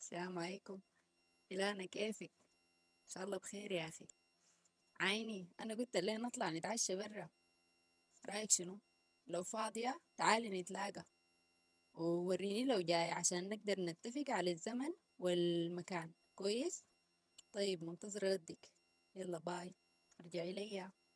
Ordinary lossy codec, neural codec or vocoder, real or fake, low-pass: none; vocoder, 22.05 kHz, 80 mel bands, HiFi-GAN; fake; none